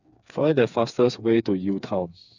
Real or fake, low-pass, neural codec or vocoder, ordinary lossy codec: fake; 7.2 kHz; codec, 16 kHz, 4 kbps, FreqCodec, smaller model; none